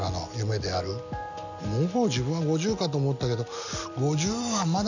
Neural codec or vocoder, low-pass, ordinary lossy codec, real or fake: none; 7.2 kHz; none; real